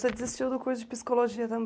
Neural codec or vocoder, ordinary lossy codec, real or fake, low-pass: none; none; real; none